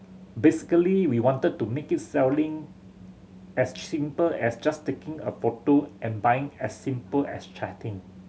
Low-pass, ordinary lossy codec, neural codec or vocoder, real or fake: none; none; none; real